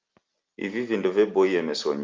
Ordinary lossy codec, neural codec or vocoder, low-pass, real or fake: Opus, 32 kbps; none; 7.2 kHz; real